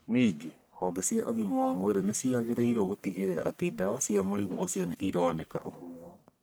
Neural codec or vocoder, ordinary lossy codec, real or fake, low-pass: codec, 44.1 kHz, 1.7 kbps, Pupu-Codec; none; fake; none